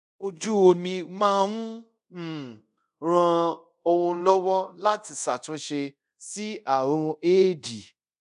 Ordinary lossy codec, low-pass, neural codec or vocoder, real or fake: none; 10.8 kHz; codec, 24 kHz, 0.5 kbps, DualCodec; fake